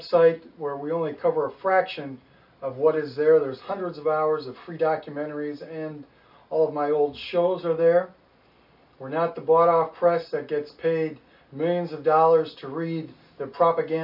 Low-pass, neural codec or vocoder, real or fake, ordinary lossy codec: 5.4 kHz; none; real; MP3, 48 kbps